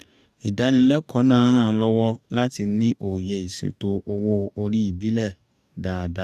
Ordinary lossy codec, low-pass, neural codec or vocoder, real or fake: none; 14.4 kHz; codec, 44.1 kHz, 2.6 kbps, DAC; fake